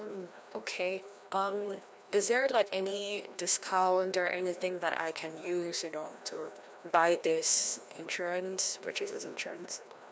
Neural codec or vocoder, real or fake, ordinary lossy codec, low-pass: codec, 16 kHz, 1 kbps, FreqCodec, larger model; fake; none; none